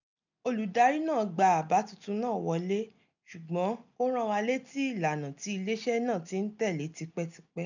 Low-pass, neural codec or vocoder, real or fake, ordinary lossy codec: 7.2 kHz; none; real; none